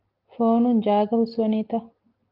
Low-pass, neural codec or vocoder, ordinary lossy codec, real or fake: 5.4 kHz; none; Opus, 32 kbps; real